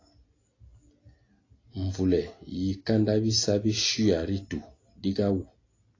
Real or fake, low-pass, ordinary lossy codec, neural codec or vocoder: real; 7.2 kHz; AAC, 32 kbps; none